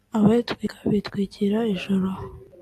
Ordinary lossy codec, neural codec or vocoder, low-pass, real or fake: AAC, 64 kbps; none; 14.4 kHz; real